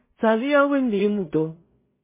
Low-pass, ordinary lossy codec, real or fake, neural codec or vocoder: 3.6 kHz; MP3, 16 kbps; fake; codec, 16 kHz in and 24 kHz out, 0.4 kbps, LongCat-Audio-Codec, two codebook decoder